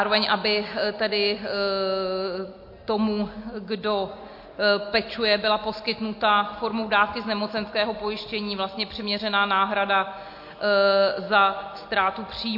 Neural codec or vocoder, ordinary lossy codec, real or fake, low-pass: none; MP3, 32 kbps; real; 5.4 kHz